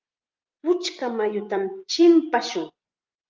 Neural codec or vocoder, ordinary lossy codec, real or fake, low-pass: vocoder, 24 kHz, 100 mel bands, Vocos; Opus, 32 kbps; fake; 7.2 kHz